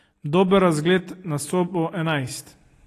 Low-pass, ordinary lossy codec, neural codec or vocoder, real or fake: 14.4 kHz; AAC, 48 kbps; none; real